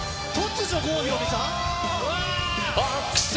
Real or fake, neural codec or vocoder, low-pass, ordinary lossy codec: real; none; none; none